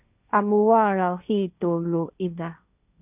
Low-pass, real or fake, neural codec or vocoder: 3.6 kHz; fake; codec, 16 kHz, 1.1 kbps, Voila-Tokenizer